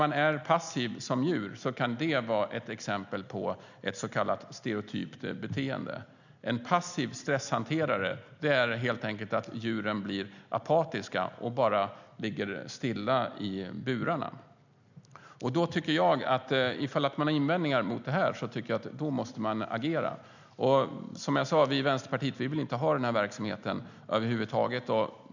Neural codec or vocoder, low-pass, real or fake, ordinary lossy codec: none; 7.2 kHz; real; none